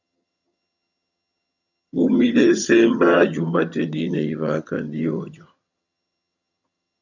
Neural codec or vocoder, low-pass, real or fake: vocoder, 22.05 kHz, 80 mel bands, HiFi-GAN; 7.2 kHz; fake